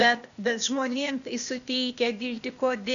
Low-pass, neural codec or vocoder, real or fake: 7.2 kHz; codec, 16 kHz, 0.8 kbps, ZipCodec; fake